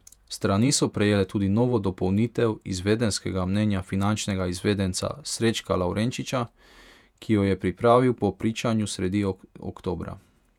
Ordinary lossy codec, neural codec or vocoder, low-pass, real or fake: none; vocoder, 48 kHz, 128 mel bands, Vocos; 19.8 kHz; fake